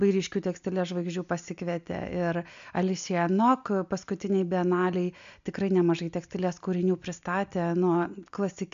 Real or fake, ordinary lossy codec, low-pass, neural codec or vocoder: real; MP3, 64 kbps; 7.2 kHz; none